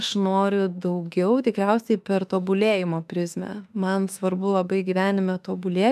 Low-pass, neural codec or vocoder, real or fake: 14.4 kHz; autoencoder, 48 kHz, 32 numbers a frame, DAC-VAE, trained on Japanese speech; fake